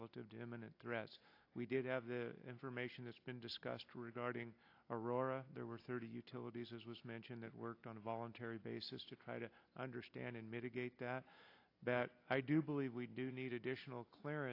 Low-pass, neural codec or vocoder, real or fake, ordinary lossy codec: 5.4 kHz; none; real; AAC, 32 kbps